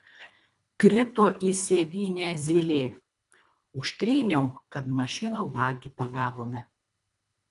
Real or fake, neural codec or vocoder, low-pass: fake; codec, 24 kHz, 1.5 kbps, HILCodec; 10.8 kHz